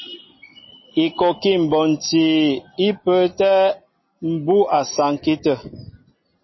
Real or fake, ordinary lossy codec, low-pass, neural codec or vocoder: real; MP3, 24 kbps; 7.2 kHz; none